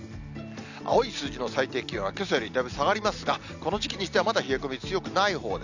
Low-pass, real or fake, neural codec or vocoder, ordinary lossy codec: 7.2 kHz; real; none; none